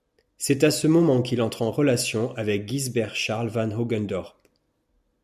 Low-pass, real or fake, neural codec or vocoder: 14.4 kHz; real; none